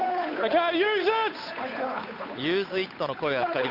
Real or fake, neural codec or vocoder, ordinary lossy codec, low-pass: fake; codec, 16 kHz, 8 kbps, FunCodec, trained on Chinese and English, 25 frames a second; none; 5.4 kHz